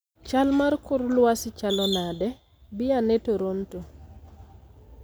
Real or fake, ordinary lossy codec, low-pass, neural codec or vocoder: real; none; none; none